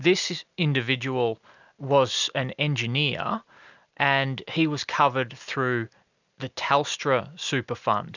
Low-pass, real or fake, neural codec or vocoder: 7.2 kHz; real; none